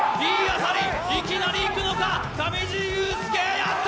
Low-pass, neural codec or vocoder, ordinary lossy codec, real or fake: none; none; none; real